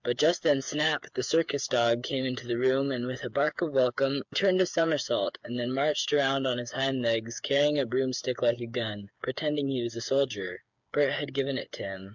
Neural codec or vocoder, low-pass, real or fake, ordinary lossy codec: codec, 16 kHz, 8 kbps, FreqCodec, smaller model; 7.2 kHz; fake; MP3, 64 kbps